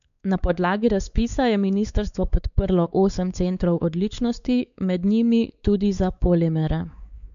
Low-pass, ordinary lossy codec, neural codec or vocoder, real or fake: 7.2 kHz; none; codec, 16 kHz, 4 kbps, X-Codec, WavLM features, trained on Multilingual LibriSpeech; fake